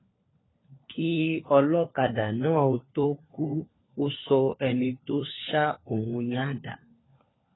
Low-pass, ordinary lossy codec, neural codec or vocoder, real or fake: 7.2 kHz; AAC, 16 kbps; codec, 16 kHz, 4 kbps, FunCodec, trained on LibriTTS, 50 frames a second; fake